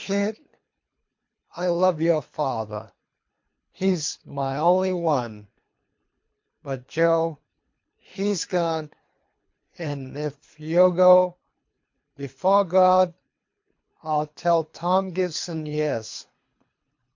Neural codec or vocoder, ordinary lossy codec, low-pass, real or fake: codec, 24 kHz, 3 kbps, HILCodec; MP3, 48 kbps; 7.2 kHz; fake